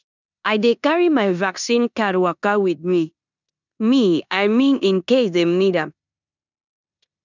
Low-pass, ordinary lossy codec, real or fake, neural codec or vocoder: 7.2 kHz; none; fake; codec, 16 kHz in and 24 kHz out, 0.9 kbps, LongCat-Audio-Codec, four codebook decoder